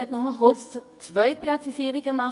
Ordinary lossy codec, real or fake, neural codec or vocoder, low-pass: none; fake; codec, 24 kHz, 0.9 kbps, WavTokenizer, medium music audio release; 10.8 kHz